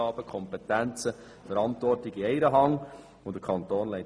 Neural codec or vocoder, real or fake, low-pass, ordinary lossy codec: none; real; none; none